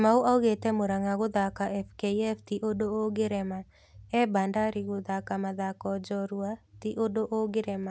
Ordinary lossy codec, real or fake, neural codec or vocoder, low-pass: none; real; none; none